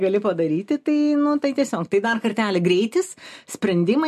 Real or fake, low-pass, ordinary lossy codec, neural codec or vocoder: real; 14.4 kHz; MP3, 64 kbps; none